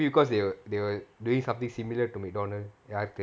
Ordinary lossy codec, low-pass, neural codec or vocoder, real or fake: none; none; none; real